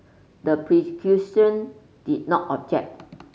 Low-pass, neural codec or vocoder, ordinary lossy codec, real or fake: none; none; none; real